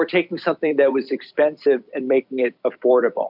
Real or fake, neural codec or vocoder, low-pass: fake; vocoder, 44.1 kHz, 128 mel bands every 256 samples, BigVGAN v2; 5.4 kHz